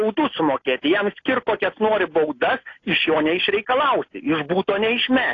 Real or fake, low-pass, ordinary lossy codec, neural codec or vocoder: real; 7.2 kHz; AAC, 32 kbps; none